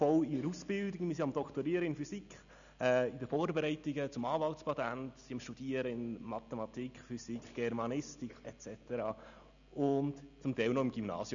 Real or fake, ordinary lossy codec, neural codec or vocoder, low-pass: real; none; none; 7.2 kHz